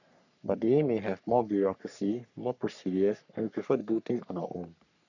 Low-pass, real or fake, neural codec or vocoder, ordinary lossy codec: 7.2 kHz; fake; codec, 44.1 kHz, 3.4 kbps, Pupu-Codec; none